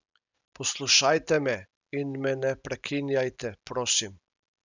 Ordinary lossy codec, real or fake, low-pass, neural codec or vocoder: none; real; 7.2 kHz; none